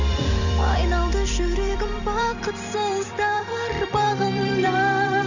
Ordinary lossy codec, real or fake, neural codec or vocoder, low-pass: none; fake; vocoder, 44.1 kHz, 128 mel bands every 256 samples, BigVGAN v2; 7.2 kHz